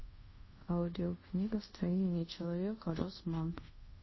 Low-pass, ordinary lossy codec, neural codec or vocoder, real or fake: 7.2 kHz; MP3, 24 kbps; codec, 24 kHz, 0.5 kbps, DualCodec; fake